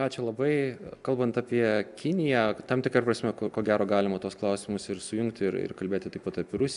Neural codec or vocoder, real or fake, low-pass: none; real; 10.8 kHz